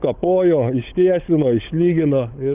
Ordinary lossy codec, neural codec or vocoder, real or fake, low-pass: Opus, 32 kbps; codec, 16 kHz, 16 kbps, FunCodec, trained on LibriTTS, 50 frames a second; fake; 3.6 kHz